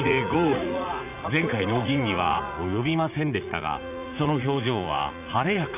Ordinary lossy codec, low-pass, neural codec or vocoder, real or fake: none; 3.6 kHz; vocoder, 44.1 kHz, 80 mel bands, Vocos; fake